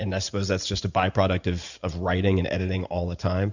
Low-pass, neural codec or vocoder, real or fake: 7.2 kHz; vocoder, 44.1 kHz, 128 mel bands every 512 samples, BigVGAN v2; fake